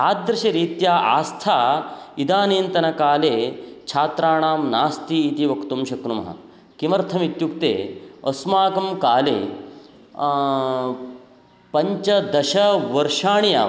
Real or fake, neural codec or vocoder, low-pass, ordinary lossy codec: real; none; none; none